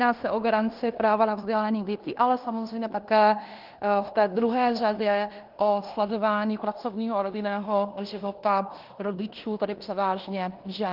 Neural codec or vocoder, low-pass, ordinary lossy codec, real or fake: codec, 16 kHz in and 24 kHz out, 0.9 kbps, LongCat-Audio-Codec, fine tuned four codebook decoder; 5.4 kHz; Opus, 32 kbps; fake